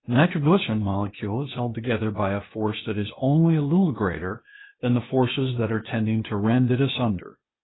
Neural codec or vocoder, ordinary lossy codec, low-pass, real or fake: codec, 16 kHz, 0.8 kbps, ZipCodec; AAC, 16 kbps; 7.2 kHz; fake